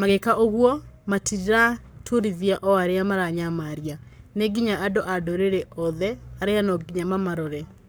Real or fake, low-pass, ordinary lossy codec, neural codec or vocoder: fake; none; none; codec, 44.1 kHz, 7.8 kbps, Pupu-Codec